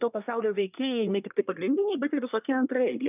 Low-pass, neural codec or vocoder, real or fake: 3.6 kHz; codec, 24 kHz, 1 kbps, SNAC; fake